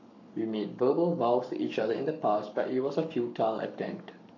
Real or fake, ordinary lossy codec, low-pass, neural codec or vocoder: fake; none; 7.2 kHz; codec, 44.1 kHz, 7.8 kbps, Pupu-Codec